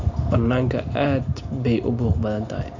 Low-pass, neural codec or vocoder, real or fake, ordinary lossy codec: 7.2 kHz; none; real; AAC, 48 kbps